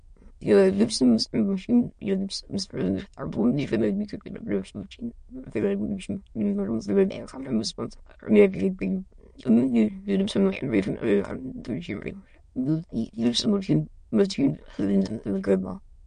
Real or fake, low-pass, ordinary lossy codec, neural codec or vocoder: fake; 9.9 kHz; MP3, 48 kbps; autoencoder, 22.05 kHz, a latent of 192 numbers a frame, VITS, trained on many speakers